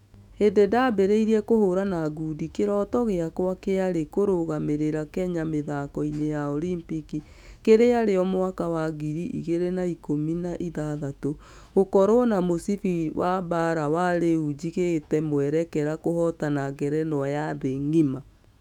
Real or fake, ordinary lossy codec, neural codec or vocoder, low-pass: fake; none; autoencoder, 48 kHz, 128 numbers a frame, DAC-VAE, trained on Japanese speech; 19.8 kHz